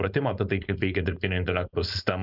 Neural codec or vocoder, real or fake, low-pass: codec, 16 kHz, 4.8 kbps, FACodec; fake; 5.4 kHz